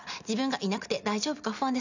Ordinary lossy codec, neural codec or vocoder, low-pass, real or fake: none; none; 7.2 kHz; real